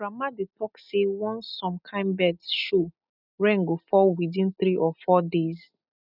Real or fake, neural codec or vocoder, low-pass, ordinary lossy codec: real; none; 5.4 kHz; none